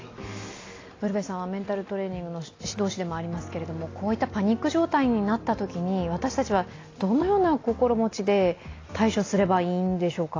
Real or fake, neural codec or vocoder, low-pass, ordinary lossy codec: real; none; 7.2 kHz; AAC, 32 kbps